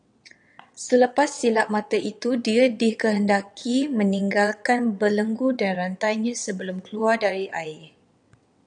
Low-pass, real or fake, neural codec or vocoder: 9.9 kHz; fake; vocoder, 22.05 kHz, 80 mel bands, WaveNeXt